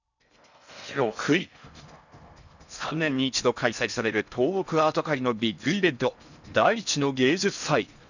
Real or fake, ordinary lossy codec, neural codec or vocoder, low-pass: fake; none; codec, 16 kHz in and 24 kHz out, 0.6 kbps, FocalCodec, streaming, 4096 codes; 7.2 kHz